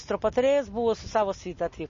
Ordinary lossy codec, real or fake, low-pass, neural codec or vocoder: MP3, 32 kbps; real; 7.2 kHz; none